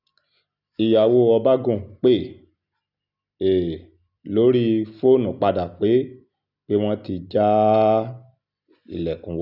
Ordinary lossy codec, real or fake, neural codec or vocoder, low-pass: none; real; none; 5.4 kHz